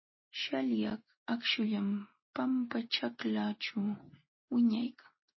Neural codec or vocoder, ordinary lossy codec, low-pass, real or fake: none; MP3, 24 kbps; 7.2 kHz; real